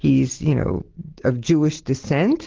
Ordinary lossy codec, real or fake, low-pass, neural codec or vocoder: Opus, 16 kbps; real; 7.2 kHz; none